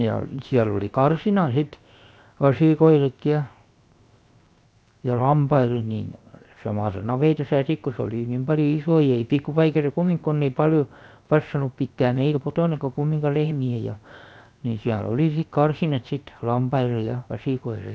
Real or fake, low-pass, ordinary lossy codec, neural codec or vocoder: fake; none; none; codec, 16 kHz, 0.7 kbps, FocalCodec